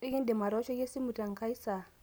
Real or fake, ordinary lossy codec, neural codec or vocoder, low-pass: real; none; none; none